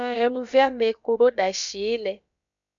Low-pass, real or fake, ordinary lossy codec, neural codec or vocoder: 7.2 kHz; fake; MP3, 64 kbps; codec, 16 kHz, about 1 kbps, DyCAST, with the encoder's durations